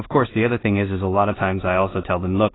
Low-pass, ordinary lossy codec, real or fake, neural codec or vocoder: 7.2 kHz; AAC, 16 kbps; fake; codec, 16 kHz in and 24 kHz out, 0.4 kbps, LongCat-Audio-Codec, two codebook decoder